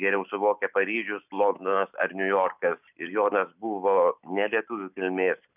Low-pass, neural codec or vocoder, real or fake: 3.6 kHz; none; real